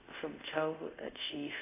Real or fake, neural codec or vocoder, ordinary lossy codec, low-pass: fake; codec, 24 kHz, 0.5 kbps, DualCodec; AAC, 24 kbps; 3.6 kHz